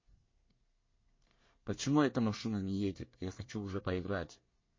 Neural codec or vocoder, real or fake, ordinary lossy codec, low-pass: codec, 24 kHz, 1 kbps, SNAC; fake; MP3, 32 kbps; 7.2 kHz